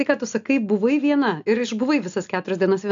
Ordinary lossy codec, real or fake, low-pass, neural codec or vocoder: AAC, 64 kbps; real; 7.2 kHz; none